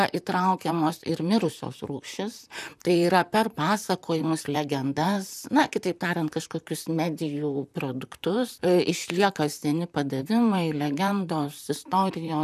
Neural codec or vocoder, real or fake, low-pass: vocoder, 44.1 kHz, 128 mel bands, Pupu-Vocoder; fake; 14.4 kHz